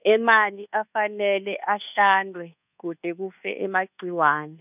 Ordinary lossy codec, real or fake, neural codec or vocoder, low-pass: none; fake; codec, 24 kHz, 1.2 kbps, DualCodec; 3.6 kHz